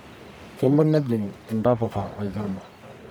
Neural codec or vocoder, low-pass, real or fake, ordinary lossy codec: codec, 44.1 kHz, 1.7 kbps, Pupu-Codec; none; fake; none